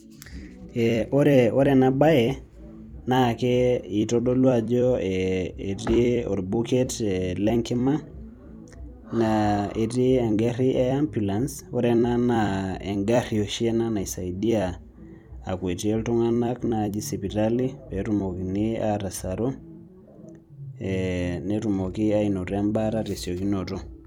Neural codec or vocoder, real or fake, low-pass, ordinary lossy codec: vocoder, 44.1 kHz, 128 mel bands every 256 samples, BigVGAN v2; fake; 19.8 kHz; none